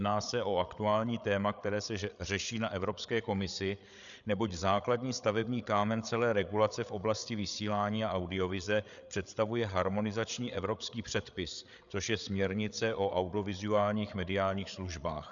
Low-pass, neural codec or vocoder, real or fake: 7.2 kHz; codec, 16 kHz, 8 kbps, FreqCodec, larger model; fake